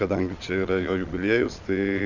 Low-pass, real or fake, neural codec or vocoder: 7.2 kHz; fake; vocoder, 22.05 kHz, 80 mel bands, Vocos